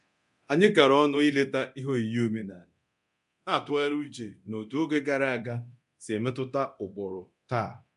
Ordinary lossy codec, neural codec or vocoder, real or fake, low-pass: none; codec, 24 kHz, 0.9 kbps, DualCodec; fake; 10.8 kHz